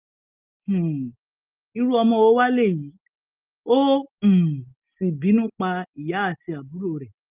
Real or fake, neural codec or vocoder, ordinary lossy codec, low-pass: real; none; Opus, 24 kbps; 3.6 kHz